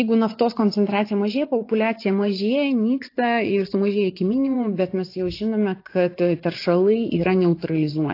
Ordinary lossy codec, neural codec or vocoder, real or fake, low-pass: AAC, 32 kbps; vocoder, 24 kHz, 100 mel bands, Vocos; fake; 5.4 kHz